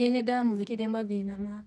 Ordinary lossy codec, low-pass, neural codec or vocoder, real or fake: none; none; codec, 24 kHz, 0.9 kbps, WavTokenizer, medium music audio release; fake